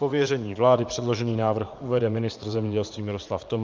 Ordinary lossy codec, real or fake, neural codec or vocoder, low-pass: Opus, 24 kbps; fake; vocoder, 44.1 kHz, 80 mel bands, Vocos; 7.2 kHz